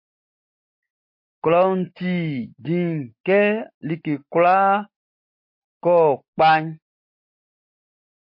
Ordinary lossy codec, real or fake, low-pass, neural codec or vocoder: MP3, 32 kbps; real; 5.4 kHz; none